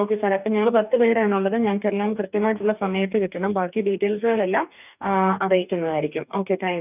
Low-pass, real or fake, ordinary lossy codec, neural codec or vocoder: 3.6 kHz; fake; none; codec, 44.1 kHz, 2.6 kbps, DAC